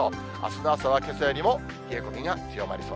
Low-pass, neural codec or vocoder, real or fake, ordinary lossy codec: none; none; real; none